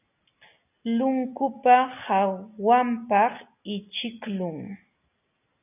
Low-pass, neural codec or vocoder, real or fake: 3.6 kHz; none; real